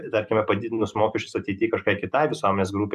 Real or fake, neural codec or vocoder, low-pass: real; none; 14.4 kHz